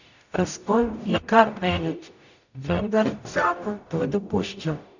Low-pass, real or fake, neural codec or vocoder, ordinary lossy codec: 7.2 kHz; fake; codec, 44.1 kHz, 0.9 kbps, DAC; none